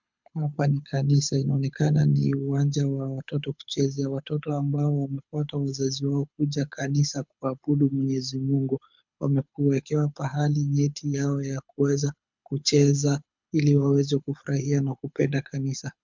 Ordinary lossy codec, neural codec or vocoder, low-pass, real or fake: MP3, 64 kbps; codec, 24 kHz, 6 kbps, HILCodec; 7.2 kHz; fake